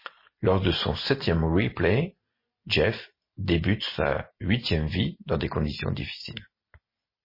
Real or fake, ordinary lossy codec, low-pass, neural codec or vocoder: real; MP3, 24 kbps; 5.4 kHz; none